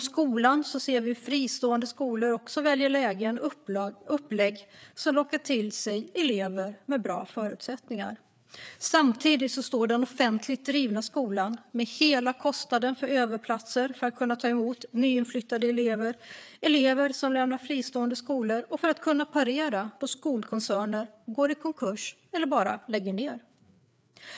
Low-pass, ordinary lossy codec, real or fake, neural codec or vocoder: none; none; fake; codec, 16 kHz, 4 kbps, FreqCodec, larger model